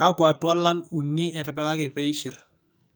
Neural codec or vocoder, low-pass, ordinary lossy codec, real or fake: codec, 44.1 kHz, 2.6 kbps, SNAC; none; none; fake